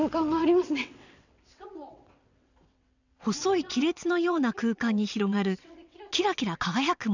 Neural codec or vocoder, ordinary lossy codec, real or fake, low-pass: vocoder, 22.05 kHz, 80 mel bands, WaveNeXt; none; fake; 7.2 kHz